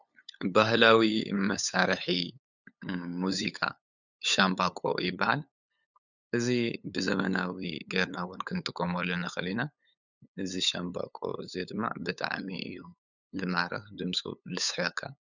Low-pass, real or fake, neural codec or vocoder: 7.2 kHz; fake; codec, 16 kHz, 8 kbps, FunCodec, trained on LibriTTS, 25 frames a second